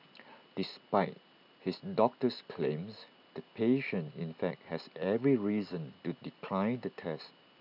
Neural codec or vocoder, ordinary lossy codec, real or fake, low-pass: none; none; real; 5.4 kHz